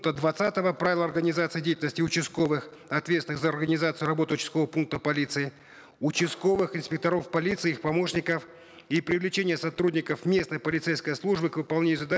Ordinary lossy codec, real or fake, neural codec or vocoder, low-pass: none; real; none; none